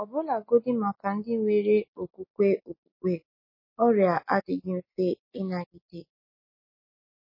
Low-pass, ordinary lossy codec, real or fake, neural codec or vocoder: 5.4 kHz; MP3, 24 kbps; real; none